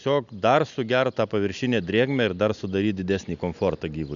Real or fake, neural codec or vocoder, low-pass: real; none; 7.2 kHz